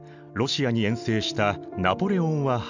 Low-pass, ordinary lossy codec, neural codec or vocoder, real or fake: 7.2 kHz; none; none; real